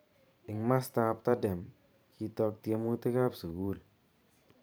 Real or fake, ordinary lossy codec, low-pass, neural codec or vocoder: fake; none; none; vocoder, 44.1 kHz, 128 mel bands every 512 samples, BigVGAN v2